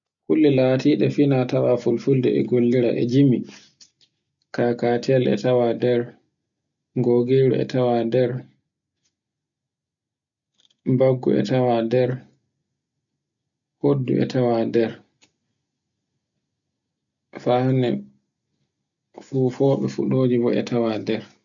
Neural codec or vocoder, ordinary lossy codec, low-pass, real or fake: none; none; 7.2 kHz; real